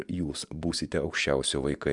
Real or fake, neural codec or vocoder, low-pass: fake; vocoder, 24 kHz, 100 mel bands, Vocos; 10.8 kHz